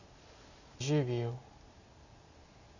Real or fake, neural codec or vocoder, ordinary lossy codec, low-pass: real; none; none; 7.2 kHz